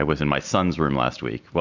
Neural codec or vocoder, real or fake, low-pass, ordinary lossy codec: none; real; 7.2 kHz; MP3, 64 kbps